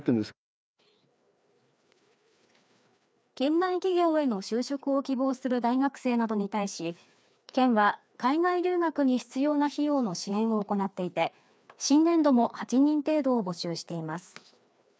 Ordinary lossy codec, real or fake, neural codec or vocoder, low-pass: none; fake; codec, 16 kHz, 2 kbps, FreqCodec, larger model; none